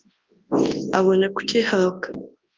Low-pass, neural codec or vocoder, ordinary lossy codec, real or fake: 7.2 kHz; codec, 24 kHz, 0.9 kbps, WavTokenizer, large speech release; Opus, 24 kbps; fake